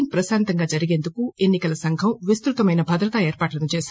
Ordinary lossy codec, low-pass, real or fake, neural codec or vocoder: none; none; real; none